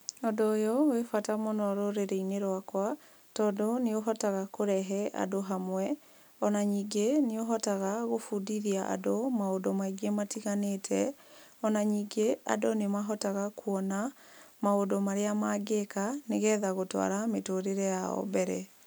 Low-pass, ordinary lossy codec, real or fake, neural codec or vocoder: none; none; real; none